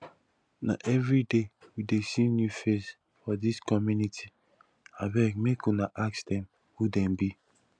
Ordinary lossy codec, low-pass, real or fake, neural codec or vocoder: none; 9.9 kHz; real; none